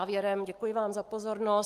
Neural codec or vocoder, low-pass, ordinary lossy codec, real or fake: none; 14.4 kHz; Opus, 32 kbps; real